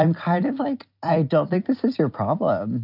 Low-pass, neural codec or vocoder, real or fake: 5.4 kHz; vocoder, 44.1 kHz, 128 mel bands every 256 samples, BigVGAN v2; fake